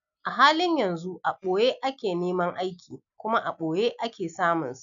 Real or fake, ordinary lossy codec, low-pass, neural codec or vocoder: real; none; 7.2 kHz; none